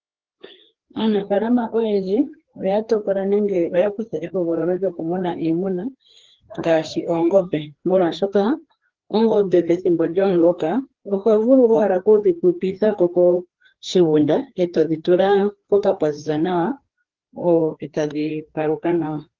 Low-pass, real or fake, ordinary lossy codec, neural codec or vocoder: 7.2 kHz; fake; Opus, 16 kbps; codec, 16 kHz, 2 kbps, FreqCodec, larger model